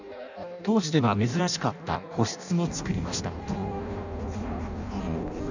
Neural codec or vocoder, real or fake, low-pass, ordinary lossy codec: codec, 16 kHz in and 24 kHz out, 0.6 kbps, FireRedTTS-2 codec; fake; 7.2 kHz; none